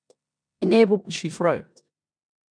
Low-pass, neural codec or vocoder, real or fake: 9.9 kHz; codec, 16 kHz in and 24 kHz out, 0.9 kbps, LongCat-Audio-Codec, four codebook decoder; fake